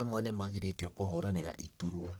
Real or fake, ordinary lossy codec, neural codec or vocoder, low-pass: fake; none; codec, 44.1 kHz, 1.7 kbps, Pupu-Codec; none